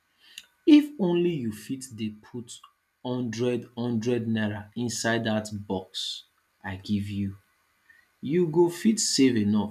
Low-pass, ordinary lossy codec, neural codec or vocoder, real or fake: 14.4 kHz; none; none; real